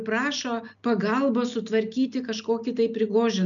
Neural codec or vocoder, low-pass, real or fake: none; 7.2 kHz; real